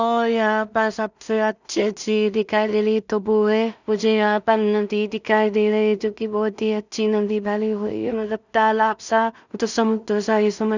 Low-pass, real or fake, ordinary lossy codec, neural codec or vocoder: 7.2 kHz; fake; none; codec, 16 kHz in and 24 kHz out, 0.4 kbps, LongCat-Audio-Codec, two codebook decoder